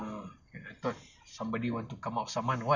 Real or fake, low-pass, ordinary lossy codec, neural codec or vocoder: real; 7.2 kHz; none; none